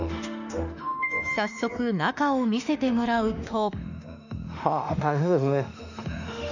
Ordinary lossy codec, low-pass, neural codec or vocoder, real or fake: none; 7.2 kHz; autoencoder, 48 kHz, 32 numbers a frame, DAC-VAE, trained on Japanese speech; fake